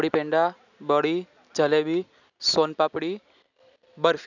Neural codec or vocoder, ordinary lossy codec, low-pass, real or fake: none; none; 7.2 kHz; real